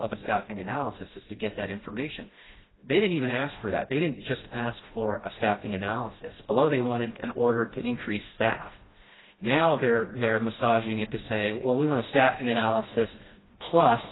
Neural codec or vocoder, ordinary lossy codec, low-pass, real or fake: codec, 16 kHz, 1 kbps, FreqCodec, smaller model; AAC, 16 kbps; 7.2 kHz; fake